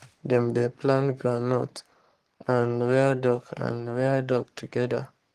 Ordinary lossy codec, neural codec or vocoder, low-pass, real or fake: Opus, 24 kbps; codec, 44.1 kHz, 3.4 kbps, Pupu-Codec; 14.4 kHz; fake